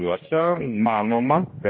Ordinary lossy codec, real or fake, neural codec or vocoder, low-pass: MP3, 24 kbps; fake; codec, 16 kHz, 1 kbps, X-Codec, HuBERT features, trained on general audio; 7.2 kHz